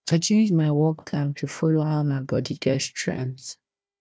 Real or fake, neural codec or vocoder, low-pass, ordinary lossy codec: fake; codec, 16 kHz, 1 kbps, FunCodec, trained on Chinese and English, 50 frames a second; none; none